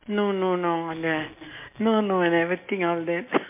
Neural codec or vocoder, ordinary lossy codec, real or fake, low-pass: none; MP3, 24 kbps; real; 3.6 kHz